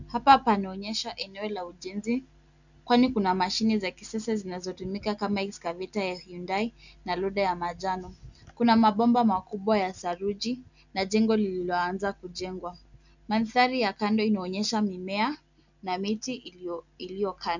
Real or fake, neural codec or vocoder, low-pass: real; none; 7.2 kHz